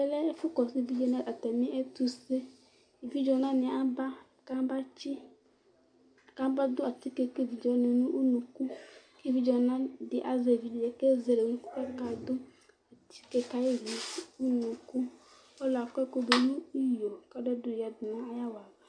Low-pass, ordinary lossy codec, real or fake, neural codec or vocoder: 9.9 kHz; MP3, 64 kbps; real; none